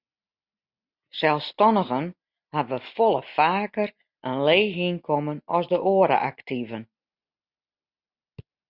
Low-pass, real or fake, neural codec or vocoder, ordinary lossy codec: 5.4 kHz; real; none; Opus, 64 kbps